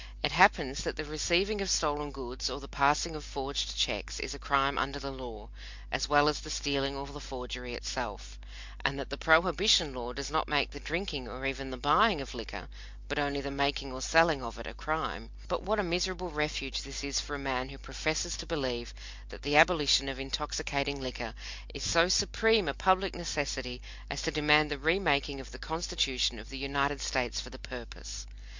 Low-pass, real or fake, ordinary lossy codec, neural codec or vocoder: 7.2 kHz; real; MP3, 64 kbps; none